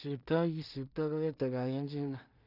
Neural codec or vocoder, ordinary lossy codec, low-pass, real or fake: codec, 16 kHz in and 24 kHz out, 0.4 kbps, LongCat-Audio-Codec, two codebook decoder; none; 5.4 kHz; fake